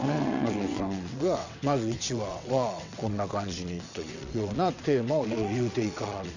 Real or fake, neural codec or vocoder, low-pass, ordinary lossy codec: fake; vocoder, 22.05 kHz, 80 mel bands, WaveNeXt; 7.2 kHz; none